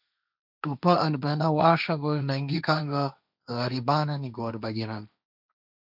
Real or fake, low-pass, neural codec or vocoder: fake; 5.4 kHz; codec, 16 kHz, 1.1 kbps, Voila-Tokenizer